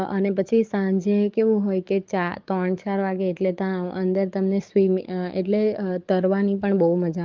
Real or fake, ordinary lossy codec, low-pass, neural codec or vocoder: fake; Opus, 32 kbps; 7.2 kHz; codec, 44.1 kHz, 7.8 kbps, DAC